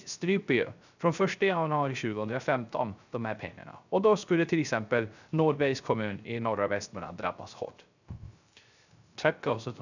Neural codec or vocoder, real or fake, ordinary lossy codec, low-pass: codec, 16 kHz, 0.3 kbps, FocalCodec; fake; none; 7.2 kHz